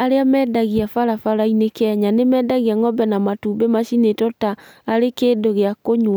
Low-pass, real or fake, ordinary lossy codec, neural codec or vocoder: none; real; none; none